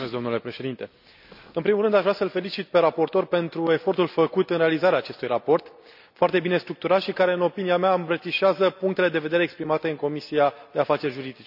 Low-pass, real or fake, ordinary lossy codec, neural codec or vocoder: 5.4 kHz; real; none; none